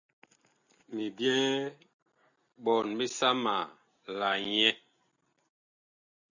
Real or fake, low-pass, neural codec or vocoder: real; 7.2 kHz; none